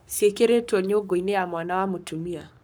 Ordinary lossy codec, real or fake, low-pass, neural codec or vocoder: none; fake; none; codec, 44.1 kHz, 7.8 kbps, Pupu-Codec